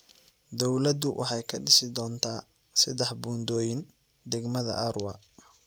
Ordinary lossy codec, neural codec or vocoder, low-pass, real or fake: none; none; none; real